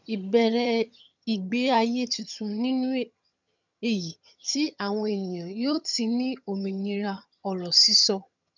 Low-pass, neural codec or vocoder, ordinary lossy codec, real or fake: 7.2 kHz; vocoder, 22.05 kHz, 80 mel bands, HiFi-GAN; none; fake